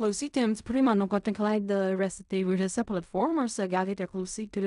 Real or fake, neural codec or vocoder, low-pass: fake; codec, 16 kHz in and 24 kHz out, 0.4 kbps, LongCat-Audio-Codec, fine tuned four codebook decoder; 10.8 kHz